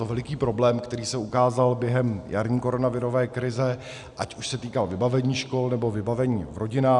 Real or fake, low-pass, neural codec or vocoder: real; 10.8 kHz; none